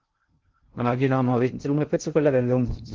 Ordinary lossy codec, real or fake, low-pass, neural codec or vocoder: Opus, 16 kbps; fake; 7.2 kHz; codec, 16 kHz in and 24 kHz out, 0.6 kbps, FocalCodec, streaming, 2048 codes